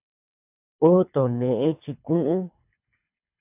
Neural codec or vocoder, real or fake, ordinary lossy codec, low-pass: codec, 24 kHz, 3 kbps, HILCodec; fake; AAC, 32 kbps; 3.6 kHz